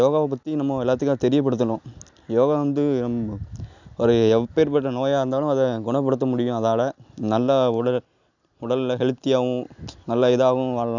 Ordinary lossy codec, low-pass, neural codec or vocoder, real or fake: none; 7.2 kHz; none; real